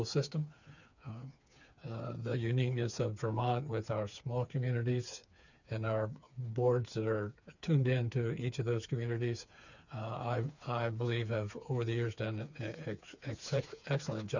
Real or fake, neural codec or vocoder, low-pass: fake; codec, 16 kHz, 4 kbps, FreqCodec, smaller model; 7.2 kHz